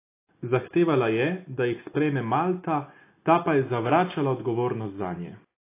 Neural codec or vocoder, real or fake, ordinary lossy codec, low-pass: none; real; AAC, 24 kbps; 3.6 kHz